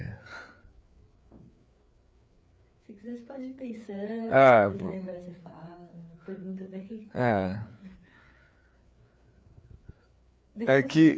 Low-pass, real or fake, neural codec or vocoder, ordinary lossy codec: none; fake; codec, 16 kHz, 4 kbps, FreqCodec, larger model; none